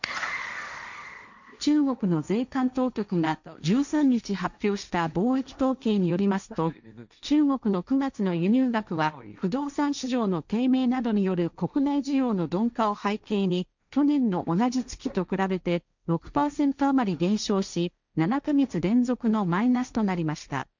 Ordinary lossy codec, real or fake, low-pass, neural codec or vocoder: none; fake; 7.2 kHz; codec, 16 kHz, 1.1 kbps, Voila-Tokenizer